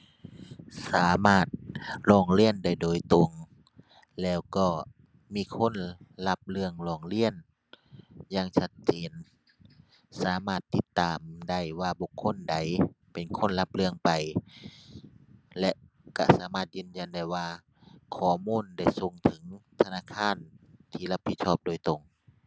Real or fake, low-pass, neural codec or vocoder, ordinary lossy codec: real; none; none; none